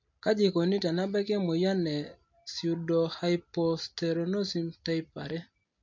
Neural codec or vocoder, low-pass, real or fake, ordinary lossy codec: none; 7.2 kHz; real; MP3, 48 kbps